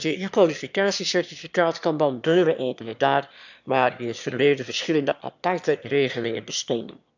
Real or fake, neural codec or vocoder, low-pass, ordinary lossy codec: fake; autoencoder, 22.05 kHz, a latent of 192 numbers a frame, VITS, trained on one speaker; 7.2 kHz; none